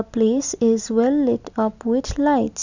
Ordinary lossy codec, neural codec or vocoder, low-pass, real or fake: none; none; 7.2 kHz; real